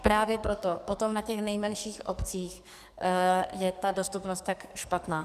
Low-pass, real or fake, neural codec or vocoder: 14.4 kHz; fake; codec, 44.1 kHz, 2.6 kbps, SNAC